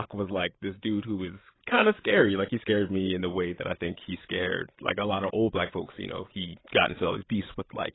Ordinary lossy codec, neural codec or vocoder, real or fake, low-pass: AAC, 16 kbps; codec, 16 kHz, 8 kbps, FreqCodec, larger model; fake; 7.2 kHz